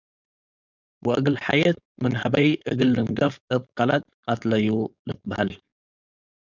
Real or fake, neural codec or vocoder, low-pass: fake; codec, 16 kHz, 4.8 kbps, FACodec; 7.2 kHz